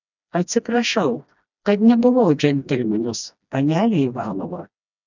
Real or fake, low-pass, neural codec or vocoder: fake; 7.2 kHz; codec, 16 kHz, 1 kbps, FreqCodec, smaller model